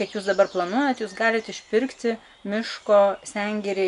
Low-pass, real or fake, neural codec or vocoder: 10.8 kHz; real; none